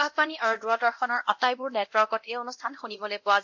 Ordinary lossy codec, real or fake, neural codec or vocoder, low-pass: MP3, 48 kbps; fake; codec, 24 kHz, 0.9 kbps, DualCodec; 7.2 kHz